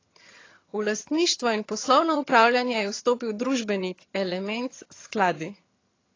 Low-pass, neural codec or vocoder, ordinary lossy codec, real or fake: 7.2 kHz; vocoder, 22.05 kHz, 80 mel bands, HiFi-GAN; AAC, 32 kbps; fake